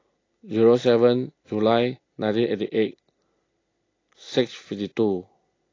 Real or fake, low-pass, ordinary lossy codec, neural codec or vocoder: real; 7.2 kHz; AAC, 32 kbps; none